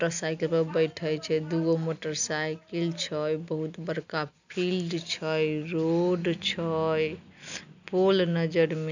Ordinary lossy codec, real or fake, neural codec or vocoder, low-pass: none; real; none; 7.2 kHz